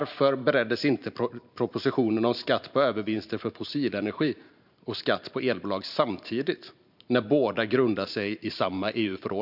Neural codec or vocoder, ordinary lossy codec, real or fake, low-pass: vocoder, 44.1 kHz, 128 mel bands every 512 samples, BigVGAN v2; none; fake; 5.4 kHz